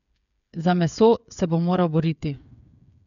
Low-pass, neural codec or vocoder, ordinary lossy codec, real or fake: 7.2 kHz; codec, 16 kHz, 8 kbps, FreqCodec, smaller model; none; fake